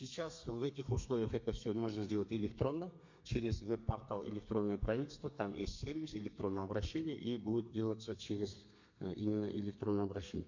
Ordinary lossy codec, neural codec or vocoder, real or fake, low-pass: MP3, 48 kbps; codec, 32 kHz, 1.9 kbps, SNAC; fake; 7.2 kHz